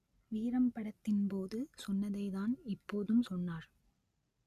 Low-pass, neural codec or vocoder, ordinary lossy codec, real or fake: 14.4 kHz; none; Opus, 64 kbps; real